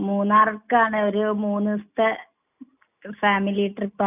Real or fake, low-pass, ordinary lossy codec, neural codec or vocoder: real; 3.6 kHz; none; none